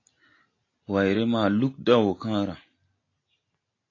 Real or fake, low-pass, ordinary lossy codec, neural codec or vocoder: real; 7.2 kHz; AAC, 32 kbps; none